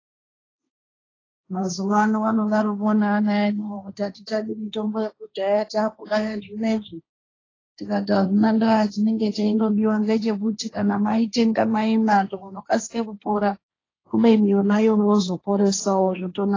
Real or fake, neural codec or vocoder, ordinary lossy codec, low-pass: fake; codec, 16 kHz, 1.1 kbps, Voila-Tokenizer; AAC, 32 kbps; 7.2 kHz